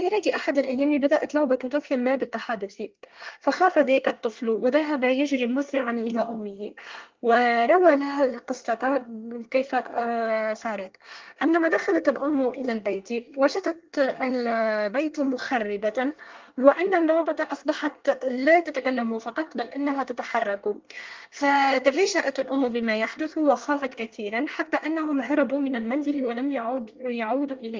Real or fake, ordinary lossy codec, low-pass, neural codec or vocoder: fake; Opus, 32 kbps; 7.2 kHz; codec, 24 kHz, 1 kbps, SNAC